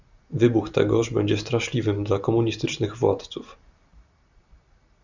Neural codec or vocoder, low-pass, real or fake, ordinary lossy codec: none; 7.2 kHz; real; Opus, 64 kbps